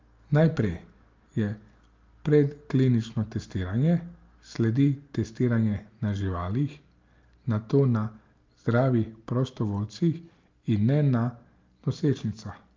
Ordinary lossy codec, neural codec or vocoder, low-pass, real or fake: Opus, 32 kbps; none; 7.2 kHz; real